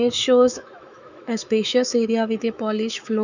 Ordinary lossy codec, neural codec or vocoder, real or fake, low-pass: none; autoencoder, 48 kHz, 128 numbers a frame, DAC-VAE, trained on Japanese speech; fake; 7.2 kHz